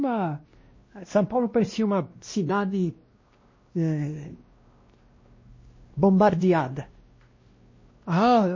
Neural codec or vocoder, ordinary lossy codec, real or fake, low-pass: codec, 16 kHz, 1 kbps, X-Codec, WavLM features, trained on Multilingual LibriSpeech; MP3, 32 kbps; fake; 7.2 kHz